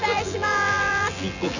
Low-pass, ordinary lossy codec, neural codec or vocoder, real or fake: 7.2 kHz; none; vocoder, 24 kHz, 100 mel bands, Vocos; fake